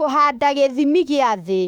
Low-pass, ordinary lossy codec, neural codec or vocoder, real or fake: 19.8 kHz; none; autoencoder, 48 kHz, 32 numbers a frame, DAC-VAE, trained on Japanese speech; fake